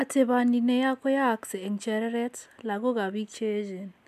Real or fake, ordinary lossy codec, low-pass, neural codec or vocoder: real; none; 14.4 kHz; none